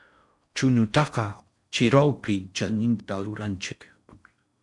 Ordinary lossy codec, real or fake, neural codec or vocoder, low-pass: MP3, 96 kbps; fake; codec, 16 kHz in and 24 kHz out, 0.6 kbps, FocalCodec, streaming, 4096 codes; 10.8 kHz